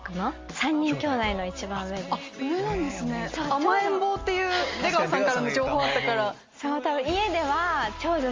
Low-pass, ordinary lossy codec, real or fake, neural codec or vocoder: 7.2 kHz; Opus, 32 kbps; real; none